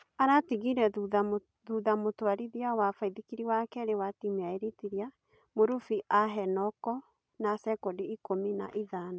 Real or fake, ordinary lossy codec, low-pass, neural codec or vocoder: real; none; none; none